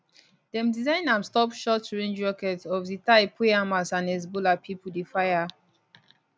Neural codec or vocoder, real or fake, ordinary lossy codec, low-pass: none; real; none; none